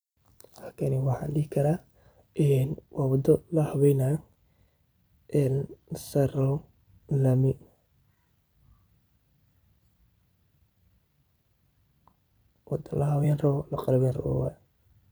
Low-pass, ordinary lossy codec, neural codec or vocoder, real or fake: none; none; vocoder, 44.1 kHz, 128 mel bands every 256 samples, BigVGAN v2; fake